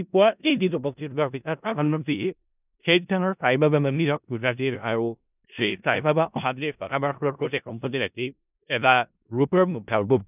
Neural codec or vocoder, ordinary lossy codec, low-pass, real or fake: codec, 16 kHz in and 24 kHz out, 0.4 kbps, LongCat-Audio-Codec, four codebook decoder; none; 3.6 kHz; fake